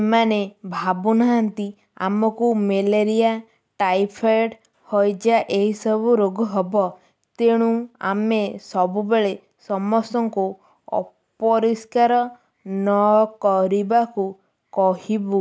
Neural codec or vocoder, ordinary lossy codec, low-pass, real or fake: none; none; none; real